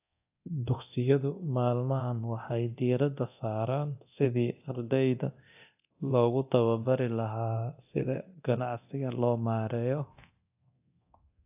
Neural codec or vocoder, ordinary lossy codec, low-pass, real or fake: codec, 24 kHz, 0.9 kbps, DualCodec; AAC, 32 kbps; 3.6 kHz; fake